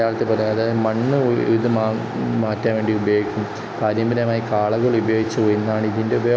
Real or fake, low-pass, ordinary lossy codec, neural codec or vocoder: real; none; none; none